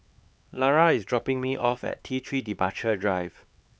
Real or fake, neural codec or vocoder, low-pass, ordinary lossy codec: fake; codec, 16 kHz, 4 kbps, X-Codec, HuBERT features, trained on LibriSpeech; none; none